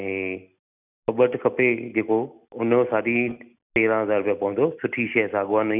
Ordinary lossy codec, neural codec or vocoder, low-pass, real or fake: none; none; 3.6 kHz; real